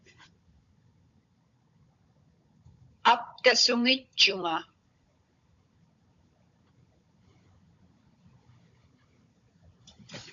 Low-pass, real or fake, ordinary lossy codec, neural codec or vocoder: 7.2 kHz; fake; AAC, 64 kbps; codec, 16 kHz, 16 kbps, FunCodec, trained on Chinese and English, 50 frames a second